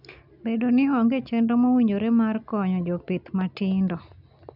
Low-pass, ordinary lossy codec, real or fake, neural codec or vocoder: 5.4 kHz; none; real; none